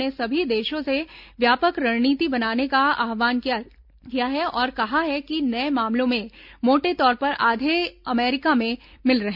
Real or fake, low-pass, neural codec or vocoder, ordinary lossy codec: real; 5.4 kHz; none; none